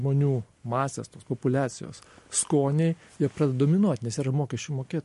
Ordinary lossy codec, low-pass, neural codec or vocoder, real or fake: MP3, 48 kbps; 14.4 kHz; none; real